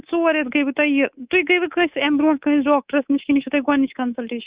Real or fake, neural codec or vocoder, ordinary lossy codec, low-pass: fake; codec, 24 kHz, 3.1 kbps, DualCodec; Opus, 64 kbps; 3.6 kHz